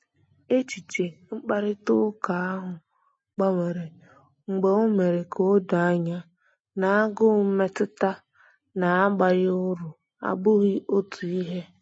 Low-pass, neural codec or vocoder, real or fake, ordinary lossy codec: 9.9 kHz; none; real; MP3, 32 kbps